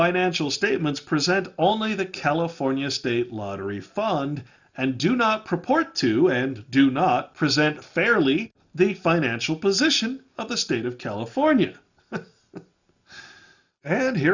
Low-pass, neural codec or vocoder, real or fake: 7.2 kHz; none; real